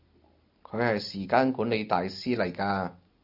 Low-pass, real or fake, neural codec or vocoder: 5.4 kHz; real; none